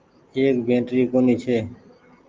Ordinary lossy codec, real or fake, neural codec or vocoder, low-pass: Opus, 24 kbps; real; none; 7.2 kHz